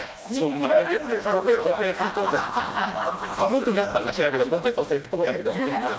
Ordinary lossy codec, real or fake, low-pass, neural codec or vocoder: none; fake; none; codec, 16 kHz, 1 kbps, FreqCodec, smaller model